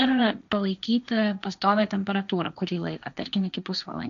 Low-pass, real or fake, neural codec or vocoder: 7.2 kHz; fake; codec, 16 kHz, 1.1 kbps, Voila-Tokenizer